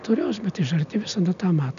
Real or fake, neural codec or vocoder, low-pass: real; none; 7.2 kHz